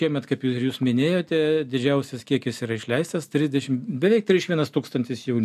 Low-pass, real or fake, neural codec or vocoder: 14.4 kHz; real; none